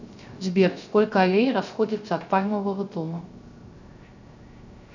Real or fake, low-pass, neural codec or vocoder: fake; 7.2 kHz; codec, 16 kHz, 0.3 kbps, FocalCodec